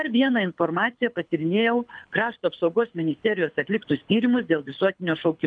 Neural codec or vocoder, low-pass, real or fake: codec, 24 kHz, 6 kbps, HILCodec; 9.9 kHz; fake